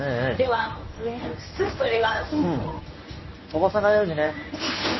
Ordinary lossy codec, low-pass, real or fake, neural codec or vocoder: MP3, 24 kbps; 7.2 kHz; fake; codec, 24 kHz, 0.9 kbps, WavTokenizer, medium speech release version 1